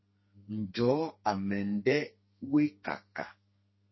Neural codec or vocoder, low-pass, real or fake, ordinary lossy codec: codec, 32 kHz, 1.9 kbps, SNAC; 7.2 kHz; fake; MP3, 24 kbps